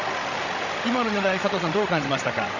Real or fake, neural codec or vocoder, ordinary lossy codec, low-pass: fake; codec, 16 kHz, 16 kbps, FreqCodec, larger model; none; 7.2 kHz